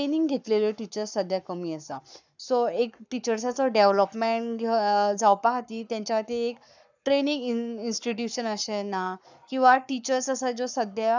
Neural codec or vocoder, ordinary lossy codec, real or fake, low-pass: codec, 44.1 kHz, 7.8 kbps, Pupu-Codec; none; fake; 7.2 kHz